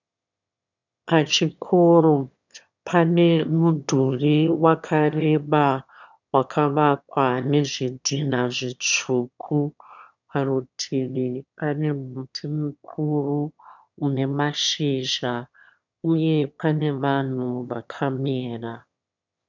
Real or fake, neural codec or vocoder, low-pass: fake; autoencoder, 22.05 kHz, a latent of 192 numbers a frame, VITS, trained on one speaker; 7.2 kHz